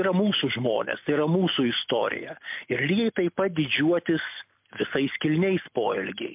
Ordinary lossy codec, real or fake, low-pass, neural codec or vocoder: MP3, 32 kbps; real; 3.6 kHz; none